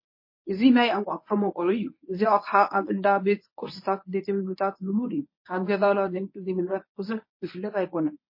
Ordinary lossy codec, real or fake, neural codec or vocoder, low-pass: MP3, 24 kbps; fake; codec, 24 kHz, 0.9 kbps, WavTokenizer, medium speech release version 1; 5.4 kHz